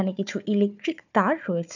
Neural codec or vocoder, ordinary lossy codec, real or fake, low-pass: codec, 16 kHz, 4 kbps, FunCodec, trained on Chinese and English, 50 frames a second; none; fake; 7.2 kHz